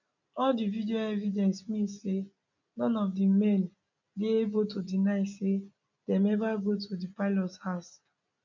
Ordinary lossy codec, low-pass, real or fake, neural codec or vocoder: none; 7.2 kHz; real; none